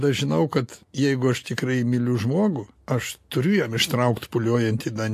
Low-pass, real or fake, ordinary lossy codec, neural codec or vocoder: 14.4 kHz; real; AAC, 64 kbps; none